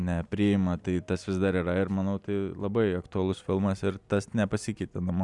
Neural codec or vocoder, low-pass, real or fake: none; 10.8 kHz; real